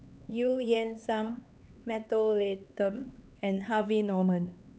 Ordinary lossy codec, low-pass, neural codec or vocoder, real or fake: none; none; codec, 16 kHz, 2 kbps, X-Codec, HuBERT features, trained on LibriSpeech; fake